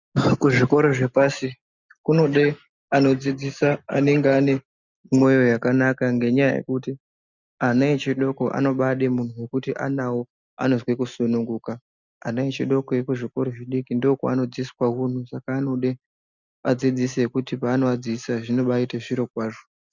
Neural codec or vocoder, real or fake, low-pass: none; real; 7.2 kHz